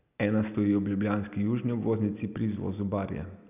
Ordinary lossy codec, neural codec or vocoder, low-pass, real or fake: none; none; 3.6 kHz; real